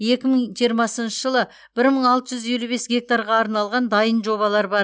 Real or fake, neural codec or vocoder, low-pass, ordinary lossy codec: real; none; none; none